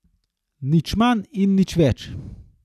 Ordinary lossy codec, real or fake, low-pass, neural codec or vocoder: none; real; 14.4 kHz; none